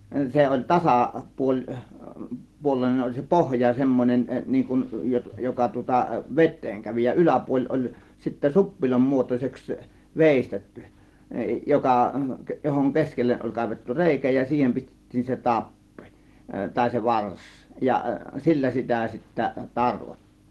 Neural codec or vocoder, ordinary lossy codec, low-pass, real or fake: none; Opus, 16 kbps; 14.4 kHz; real